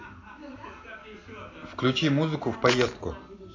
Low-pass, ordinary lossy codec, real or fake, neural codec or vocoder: 7.2 kHz; AAC, 32 kbps; real; none